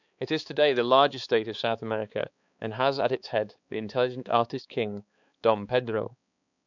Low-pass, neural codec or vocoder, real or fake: 7.2 kHz; codec, 16 kHz, 4 kbps, X-Codec, HuBERT features, trained on balanced general audio; fake